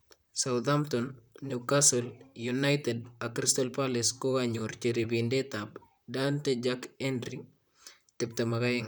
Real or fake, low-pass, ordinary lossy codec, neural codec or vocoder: fake; none; none; vocoder, 44.1 kHz, 128 mel bands, Pupu-Vocoder